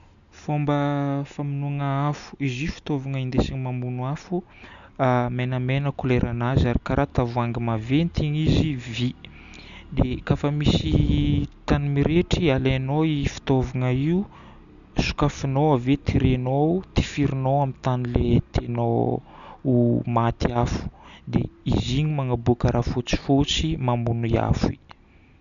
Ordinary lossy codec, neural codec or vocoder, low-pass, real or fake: none; none; 7.2 kHz; real